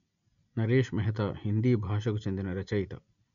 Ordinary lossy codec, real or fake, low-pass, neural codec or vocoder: none; real; 7.2 kHz; none